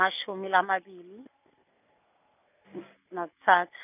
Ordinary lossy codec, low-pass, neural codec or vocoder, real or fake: none; 3.6 kHz; none; real